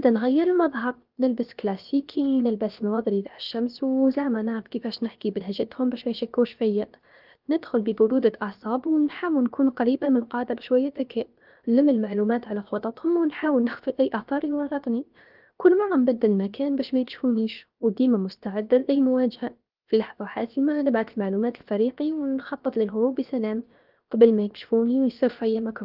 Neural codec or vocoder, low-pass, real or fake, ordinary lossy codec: codec, 16 kHz, 0.7 kbps, FocalCodec; 5.4 kHz; fake; Opus, 24 kbps